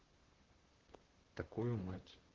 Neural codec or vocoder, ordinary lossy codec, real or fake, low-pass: vocoder, 44.1 kHz, 128 mel bands, Pupu-Vocoder; Opus, 16 kbps; fake; 7.2 kHz